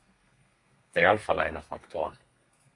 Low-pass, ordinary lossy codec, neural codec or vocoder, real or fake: 10.8 kHz; AAC, 48 kbps; codec, 32 kHz, 1.9 kbps, SNAC; fake